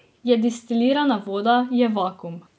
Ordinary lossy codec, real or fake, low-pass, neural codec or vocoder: none; real; none; none